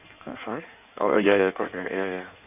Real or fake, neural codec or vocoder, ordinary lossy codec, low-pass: fake; codec, 16 kHz in and 24 kHz out, 1.1 kbps, FireRedTTS-2 codec; none; 3.6 kHz